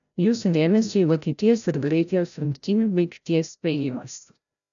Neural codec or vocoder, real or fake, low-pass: codec, 16 kHz, 0.5 kbps, FreqCodec, larger model; fake; 7.2 kHz